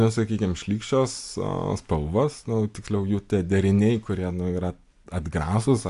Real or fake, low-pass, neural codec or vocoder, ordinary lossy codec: real; 10.8 kHz; none; AAC, 64 kbps